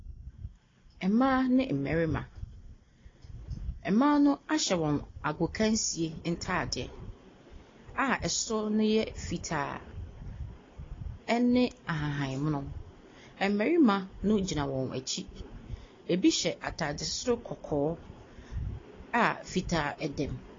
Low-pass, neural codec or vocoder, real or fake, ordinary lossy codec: 7.2 kHz; none; real; AAC, 32 kbps